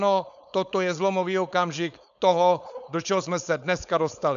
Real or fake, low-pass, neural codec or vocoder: fake; 7.2 kHz; codec, 16 kHz, 4.8 kbps, FACodec